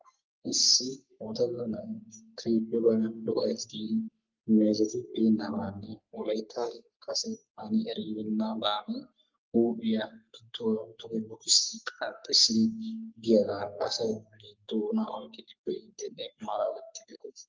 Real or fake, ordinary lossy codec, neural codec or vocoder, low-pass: fake; Opus, 32 kbps; codec, 44.1 kHz, 3.4 kbps, Pupu-Codec; 7.2 kHz